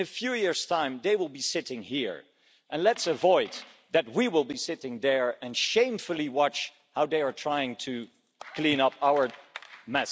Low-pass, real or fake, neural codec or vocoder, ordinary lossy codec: none; real; none; none